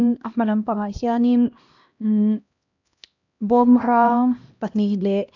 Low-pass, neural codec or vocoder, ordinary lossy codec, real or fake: 7.2 kHz; codec, 16 kHz, 1 kbps, X-Codec, HuBERT features, trained on LibriSpeech; none; fake